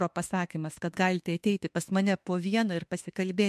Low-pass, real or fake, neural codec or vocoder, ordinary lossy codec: 14.4 kHz; fake; autoencoder, 48 kHz, 32 numbers a frame, DAC-VAE, trained on Japanese speech; MP3, 64 kbps